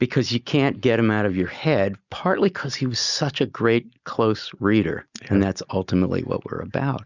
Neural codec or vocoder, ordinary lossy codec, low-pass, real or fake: none; Opus, 64 kbps; 7.2 kHz; real